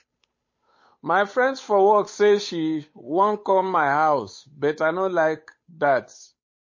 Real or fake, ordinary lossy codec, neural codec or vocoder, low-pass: fake; MP3, 32 kbps; codec, 16 kHz, 8 kbps, FunCodec, trained on Chinese and English, 25 frames a second; 7.2 kHz